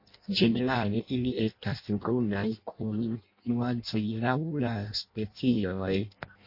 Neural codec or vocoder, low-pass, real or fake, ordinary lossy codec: codec, 16 kHz in and 24 kHz out, 0.6 kbps, FireRedTTS-2 codec; 5.4 kHz; fake; MP3, 32 kbps